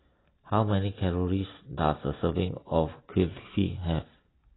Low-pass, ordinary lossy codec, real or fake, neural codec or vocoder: 7.2 kHz; AAC, 16 kbps; real; none